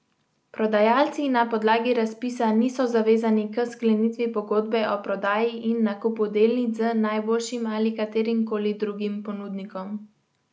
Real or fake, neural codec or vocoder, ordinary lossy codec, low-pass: real; none; none; none